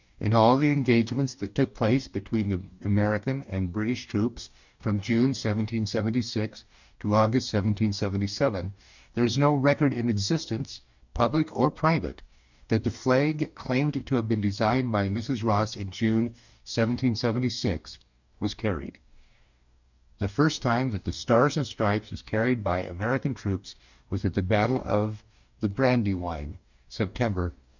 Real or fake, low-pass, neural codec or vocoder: fake; 7.2 kHz; codec, 44.1 kHz, 2.6 kbps, DAC